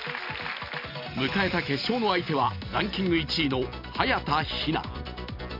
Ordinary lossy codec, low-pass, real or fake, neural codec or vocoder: none; 5.4 kHz; real; none